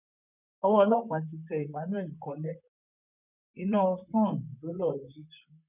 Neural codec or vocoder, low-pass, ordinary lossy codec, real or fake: vocoder, 44.1 kHz, 128 mel bands, Pupu-Vocoder; 3.6 kHz; none; fake